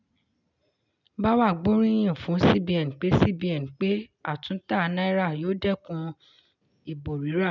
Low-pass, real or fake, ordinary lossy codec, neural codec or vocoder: 7.2 kHz; real; none; none